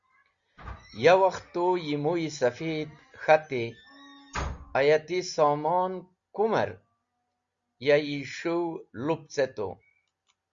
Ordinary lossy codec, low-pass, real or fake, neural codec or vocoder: Opus, 64 kbps; 7.2 kHz; real; none